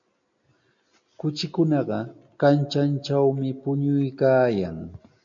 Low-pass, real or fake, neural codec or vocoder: 7.2 kHz; real; none